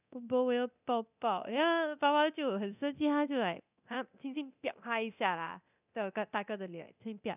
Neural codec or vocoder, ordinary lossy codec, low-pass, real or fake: codec, 24 kHz, 0.9 kbps, DualCodec; none; 3.6 kHz; fake